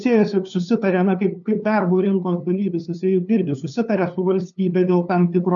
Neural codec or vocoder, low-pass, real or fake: codec, 16 kHz, 2 kbps, FunCodec, trained on LibriTTS, 25 frames a second; 7.2 kHz; fake